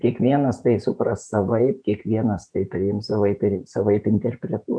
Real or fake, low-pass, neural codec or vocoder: fake; 9.9 kHz; vocoder, 48 kHz, 128 mel bands, Vocos